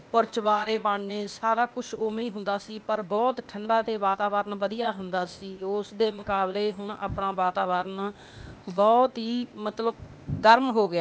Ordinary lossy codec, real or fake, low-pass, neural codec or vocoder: none; fake; none; codec, 16 kHz, 0.8 kbps, ZipCodec